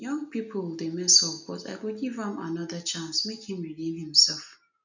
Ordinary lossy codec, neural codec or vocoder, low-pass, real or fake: none; none; 7.2 kHz; real